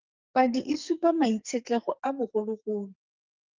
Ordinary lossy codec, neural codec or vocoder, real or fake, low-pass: Opus, 32 kbps; codec, 44.1 kHz, 2.6 kbps, SNAC; fake; 7.2 kHz